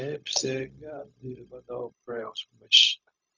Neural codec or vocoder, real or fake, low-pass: codec, 16 kHz, 0.4 kbps, LongCat-Audio-Codec; fake; 7.2 kHz